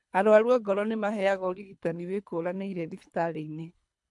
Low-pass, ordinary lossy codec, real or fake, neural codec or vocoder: 10.8 kHz; MP3, 64 kbps; fake; codec, 24 kHz, 3 kbps, HILCodec